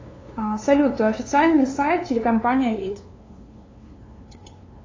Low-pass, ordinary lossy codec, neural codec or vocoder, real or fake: 7.2 kHz; AAC, 32 kbps; codec, 16 kHz, 2 kbps, FunCodec, trained on LibriTTS, 25 frames a second; fake